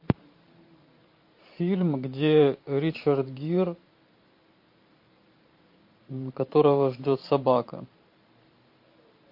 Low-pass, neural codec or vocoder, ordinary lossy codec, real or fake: 5.4 kHz; vocoder, 44.1 kHz, 128 mel bands every 512 samples, BigVGAN v2; MP3, 32 kbps; fake